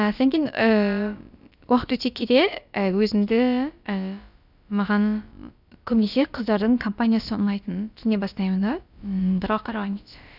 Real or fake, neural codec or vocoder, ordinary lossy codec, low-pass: fake; codec, 16 kHz, about 1 kbps, DyCAST, with the encoder's durations; none; 5.4 kHz